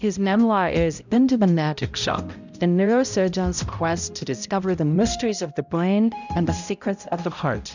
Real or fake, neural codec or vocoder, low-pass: fake; codec, 16 kHz, 0.5 kbps, X-Codec, HuBERT features, trained on balanced general audio; 7.2 kHz